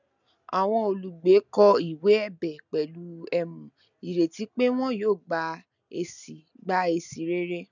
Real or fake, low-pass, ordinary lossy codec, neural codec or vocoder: real; 7.2 kHz; none; none